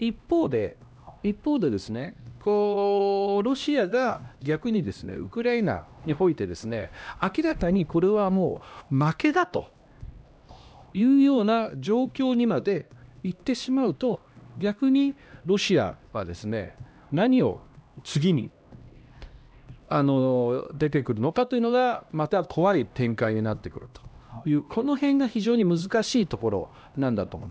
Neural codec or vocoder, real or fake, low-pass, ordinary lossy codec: codec, 16 kHz, 1 kbps, X-Codec, HuBERT features, trained on LibriSpeech; fake; none; none